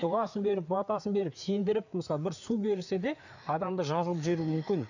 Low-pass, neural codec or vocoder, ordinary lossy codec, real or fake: 7.2 kHz; codec, 16 kHz, 4 kbps, FreqCodec, larger model; none; fake